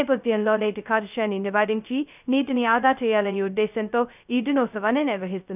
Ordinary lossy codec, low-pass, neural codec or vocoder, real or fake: none; 3.6 kHz; codec, 16 kHz, 0.2 kbps, FocalCodec; fake